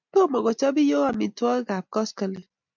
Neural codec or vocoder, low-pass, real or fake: none; 7.2 kHz; real